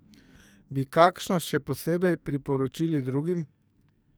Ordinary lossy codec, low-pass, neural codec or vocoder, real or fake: none; none; codec, 44.1 kHz, 2.6 kbps, SNAC; fake